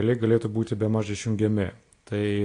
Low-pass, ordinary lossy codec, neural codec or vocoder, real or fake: 9.9 kHz; AAC, 48 kbps; none; real